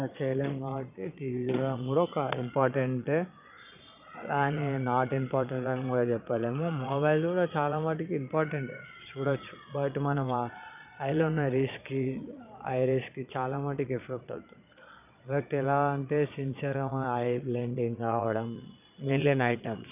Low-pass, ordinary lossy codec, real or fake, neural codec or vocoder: 3.6 kHz; none; fake; vocoder, 22.05 kHz, 80 mel bands, Vocos